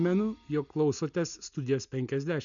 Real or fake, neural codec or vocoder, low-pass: real; none; 7.2 kHz